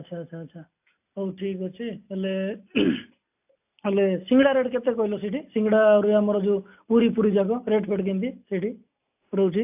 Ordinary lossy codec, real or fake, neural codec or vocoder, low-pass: AAC, 32 kbps; real; none; 3.6 kHz